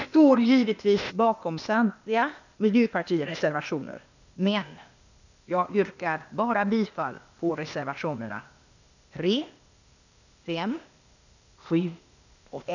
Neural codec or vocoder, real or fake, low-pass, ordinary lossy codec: codec, 16 kHz, 0.8 kbps, ZipCodec; fake; 7.2 kHz; none